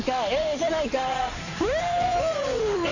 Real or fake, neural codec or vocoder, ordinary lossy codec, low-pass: fake; codec, 16 kHz in and 24 kHz out, 2.2 kbps, FireRedTTS-2 codec; none; 7.2 kHz